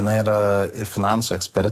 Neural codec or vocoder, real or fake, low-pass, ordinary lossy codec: codec, 44.1 kHz, 2.6 kbps, SNAC; fake; 14.4 kHz; Opus, 64 kbps